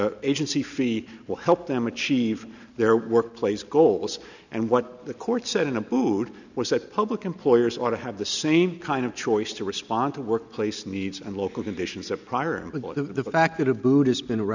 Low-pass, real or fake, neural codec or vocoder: 7.2 kHz; real; none